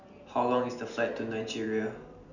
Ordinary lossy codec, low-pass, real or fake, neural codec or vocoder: none; 7.2 kHz; real; none